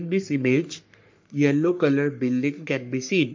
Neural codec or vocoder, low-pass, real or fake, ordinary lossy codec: codec, 44.1 kHz, 3.4 kbps, Pupu-Codec; 7.2 kHz; fake; MP3, 48 kbps